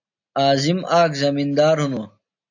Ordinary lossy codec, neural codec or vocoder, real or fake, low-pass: AAC, 48 kbps; none; real; 7.2 kHz